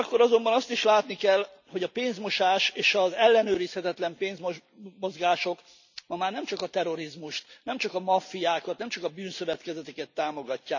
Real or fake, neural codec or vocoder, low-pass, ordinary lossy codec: real; none; 7.2 kHz; none